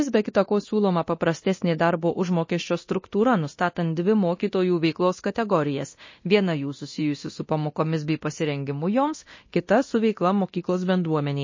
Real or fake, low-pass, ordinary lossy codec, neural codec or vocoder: fake; 7.2 kHz; MP3, 32 kbps; codec, 24 kHz, 0.9 kbps, DualCodec